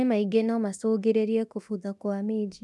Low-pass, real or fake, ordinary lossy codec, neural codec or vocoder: none; fake; none; codec, 24 kHz, 0.9 kbps, DualCodec